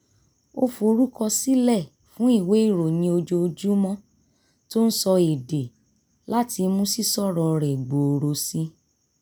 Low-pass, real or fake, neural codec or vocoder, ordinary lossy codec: none; real; none; none